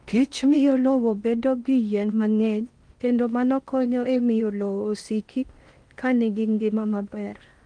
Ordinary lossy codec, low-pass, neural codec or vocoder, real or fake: Opus, 32 kbps; 9.9 kHz; codec, 16 kHz in and 24 kHz out, 0.6 kbps, FocalCodec, streaming, 4096 codes; fake